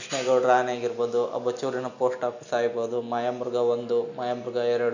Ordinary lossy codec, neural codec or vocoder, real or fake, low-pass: none; none; real; 7.2 kHz